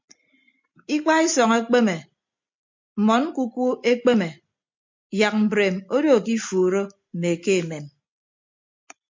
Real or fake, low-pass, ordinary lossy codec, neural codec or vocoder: real; 7.2 kHz; MP3, 48 kbps; none